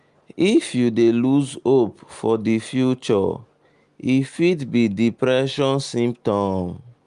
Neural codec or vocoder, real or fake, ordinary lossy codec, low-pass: none; real; Opus, 32 kbps; 10.8 kHz